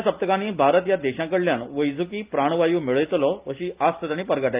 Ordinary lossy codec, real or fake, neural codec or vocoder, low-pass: Opus, 24 kbps; real; none; 3.6 kHz